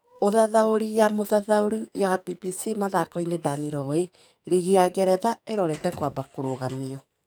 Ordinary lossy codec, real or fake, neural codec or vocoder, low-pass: none; fake; codec, 44.1 kHz, 2.6 kbps, SNAC; none